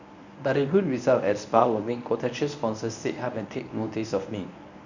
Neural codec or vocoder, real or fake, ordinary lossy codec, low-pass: codec, 24 kHz, 0.9 kbps, WavTokenizer, medium speech release version 1; fake; none; 7.2 kHz